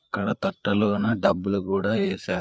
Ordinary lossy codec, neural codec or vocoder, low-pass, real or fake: none; codec, 16 kHz, 4 kbps, FreqCodec, larger model; none; fake